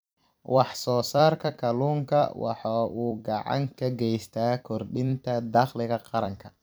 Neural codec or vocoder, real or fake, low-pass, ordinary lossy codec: vocoder, 44.1 kHz, 128 mel bands every 256 samples, BigVGAN v2; fake; none; none